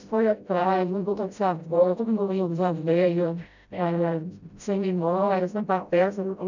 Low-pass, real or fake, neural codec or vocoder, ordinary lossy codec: 7.2 kHz; fake; codec, 16 kHz, 0.5 kbps, FreqCodec, smaller model; none